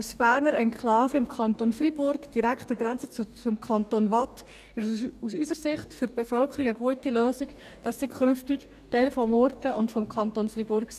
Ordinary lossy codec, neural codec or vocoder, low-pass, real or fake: none; codec, 44.1 kHz, 2.6 kbps, DAC; 14.4 kHz; fake